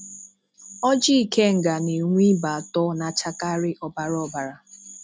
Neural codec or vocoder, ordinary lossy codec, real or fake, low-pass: none; none; real; none